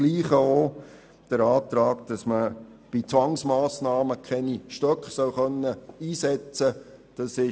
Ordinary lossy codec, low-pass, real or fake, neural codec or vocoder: none; none; real; none